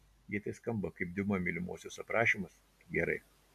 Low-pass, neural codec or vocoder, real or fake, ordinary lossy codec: 14.4 kHz; none; real; AAC, 96 kbps